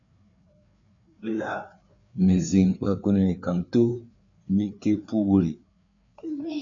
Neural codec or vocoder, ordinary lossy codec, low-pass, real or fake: codec, 16 kHz, 4 kbps, FreqCodec, larger model; MP3, 96 kbps; 7.2 kHz; fake